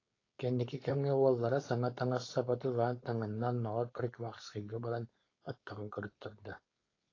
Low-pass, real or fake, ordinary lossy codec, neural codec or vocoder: 7.2 kHz; fake; AAC, 32 kbps; codec, 16 kHz, 4.8 kbps, FACodec